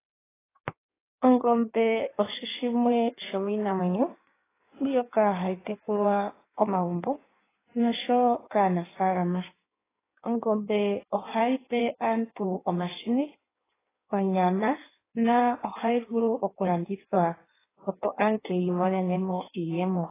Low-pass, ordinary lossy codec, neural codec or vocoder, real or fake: 3.6 kHz; AAC, 16 kbps; codec, 16 kHz in and 24 kHz out, 1.1 kbps, FireRedTTS-2 codec; fake